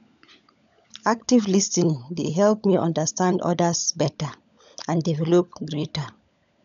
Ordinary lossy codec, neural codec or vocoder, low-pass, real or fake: none; codec, 16 kHz, 16 kbps, FunCodec, trained on LibriTTS, 50 frames a second; 7.2 kHz; fake